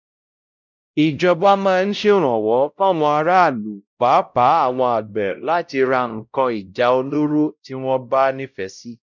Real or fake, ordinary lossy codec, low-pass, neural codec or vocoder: fake; none; 7.2 kHz; codec, 16 kHz, 0.5 kbps, X-Codec, WavLM features, trained on Multilingual LibriSpeech